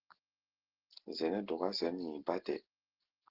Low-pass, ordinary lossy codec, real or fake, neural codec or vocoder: 5.4 kHz; Opus, 16 kbps; real; none